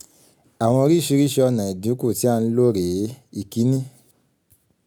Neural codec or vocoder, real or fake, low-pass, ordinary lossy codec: none; real; none; none